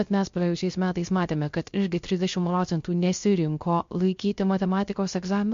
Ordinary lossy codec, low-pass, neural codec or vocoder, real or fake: MP3, 48 kbps; 7.2 kHz; codec, 16 kHz, 0.3 kbps, FocalCodec; fake